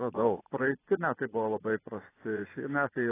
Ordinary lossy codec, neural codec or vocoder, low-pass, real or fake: AAC, 16 kbps; vocoder, 24 kHz, 100 mel bands, Vocos; 3.6 kHz; fake